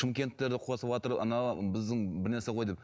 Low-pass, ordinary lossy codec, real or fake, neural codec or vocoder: none; none; real; none